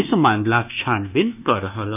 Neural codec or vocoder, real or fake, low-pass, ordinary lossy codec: codec, 24 kHz, 1.2 kbps, DualCodec; fake; 3.6 kHz; none